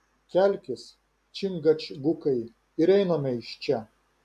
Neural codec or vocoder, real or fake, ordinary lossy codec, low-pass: none; real; AAC, 96 kbps; 14.4 kHz